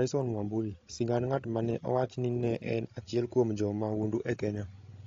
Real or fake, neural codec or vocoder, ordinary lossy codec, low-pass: fake; codec, 16 kHz, 16 kbps, FreqCodec, larger model; AAC, 32 kbps; 7.2 kHz